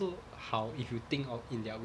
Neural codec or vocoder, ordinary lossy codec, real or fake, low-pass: none; none; real; none